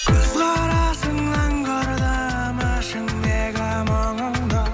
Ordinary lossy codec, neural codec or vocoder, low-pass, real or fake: none; none; none; real